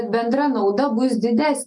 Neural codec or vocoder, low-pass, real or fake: none; 10.8 kHz; real